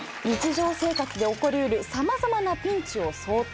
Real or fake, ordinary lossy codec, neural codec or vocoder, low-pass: real; none; none; none